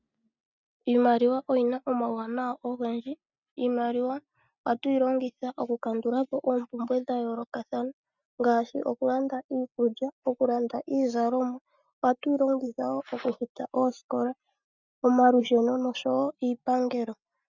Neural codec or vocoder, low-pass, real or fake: none; 7.2 kHz; real